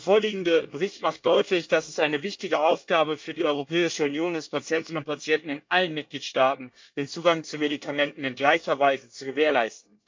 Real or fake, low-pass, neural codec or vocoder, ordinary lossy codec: fake; 7.2 kHz; codec, 24 kHz, 1 kbps, SNAC; MP3, 64 kbps